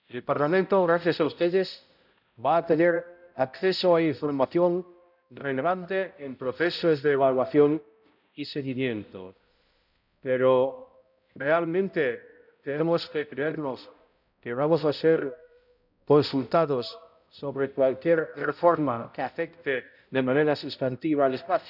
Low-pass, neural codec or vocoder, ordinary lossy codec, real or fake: 5.4 kHz; codec, 16 kHz, 0.5 kbps, X-Codec, HuBERT features, trained on balanced general audio; none; fake